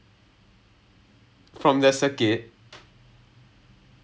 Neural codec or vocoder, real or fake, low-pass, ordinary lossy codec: none; real; none; none